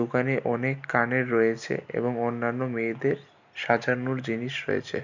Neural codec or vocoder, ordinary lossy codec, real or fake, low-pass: none; none; real; 7.2 kHz